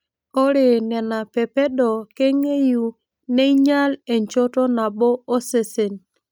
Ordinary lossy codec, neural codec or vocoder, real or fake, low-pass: none; none; real; none